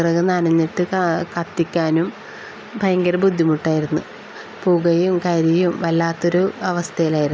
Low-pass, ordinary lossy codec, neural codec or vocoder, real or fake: none; none; none; real